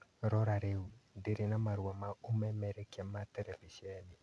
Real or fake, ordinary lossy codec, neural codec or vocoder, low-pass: real; none; none; none